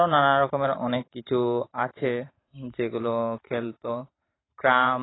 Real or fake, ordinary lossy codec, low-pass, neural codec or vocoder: fake; AAC, 16 kbps; 7.2 kHz; vocoder, 44.1 kHz, 128 mel bands every 512 samples, BigVGAN v2